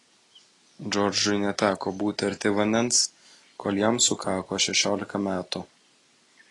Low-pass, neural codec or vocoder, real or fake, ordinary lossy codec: 10.8 kHz; none; real; AAC, 32 kbps